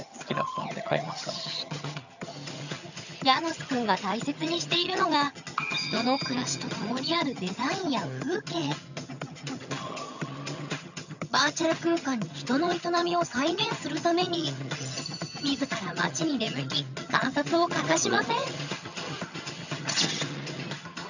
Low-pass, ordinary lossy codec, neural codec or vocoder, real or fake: 7.2 kHz; none; vocoder, 22.05 kHz, 80 mel bands, HiFi-GAN; fake